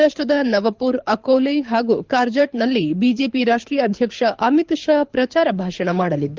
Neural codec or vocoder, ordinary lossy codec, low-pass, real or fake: codec, 24 kHz, 6 kbps, HILCodec; Opus, 32 kbps; 7.2 kHz; fake